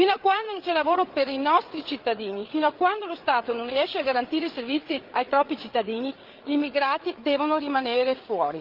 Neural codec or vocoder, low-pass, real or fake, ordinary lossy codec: codec, 16 kHz, 8 kbps, FreqCodec, larger model; 5.4 kHz; fake; Opus, 32 kbps